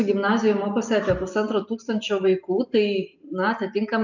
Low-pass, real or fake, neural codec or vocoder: 7.2 kHz; real; none